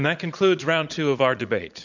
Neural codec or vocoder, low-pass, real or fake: vocoder, 44.1 kHz, 80 mel bands, Vocos; 7.2 kHz; fake